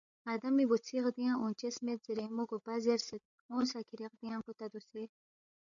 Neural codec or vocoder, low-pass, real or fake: codec, 16 kHz, 8 kbps, FreqCodec, larger model; 7.2 kHz; fake